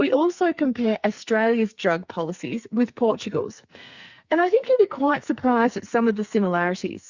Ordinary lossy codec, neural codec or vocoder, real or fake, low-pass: Opus, 64 kbps; codec, 44.1 kHz, 2.6 kbps, SNAC; fake; 7.2 kHz